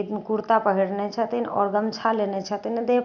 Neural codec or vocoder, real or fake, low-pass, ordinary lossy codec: none; real; 7.2 kHz; none